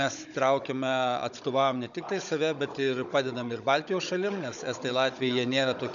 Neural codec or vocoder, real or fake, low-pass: codec, 16 kHz, 16 kbps, FunCodec, trained on Chinese and English, 50 frames a second; fake; 7.2 kHz